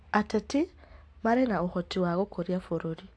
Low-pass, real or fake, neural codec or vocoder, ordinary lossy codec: 9.9 kHz; real; none; AAC, 48 kbps